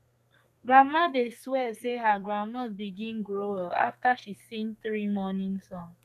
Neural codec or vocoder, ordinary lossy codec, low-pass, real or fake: codec, 32 kHz, 1.9 kbps, SNAC; none; 14.4 kHz; fake